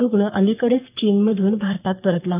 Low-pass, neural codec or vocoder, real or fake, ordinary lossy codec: 3.6 kHz; codec, 44.1 kHz, 3.4 kbps, Pupu-Codec; fake; AAC, 32 kbps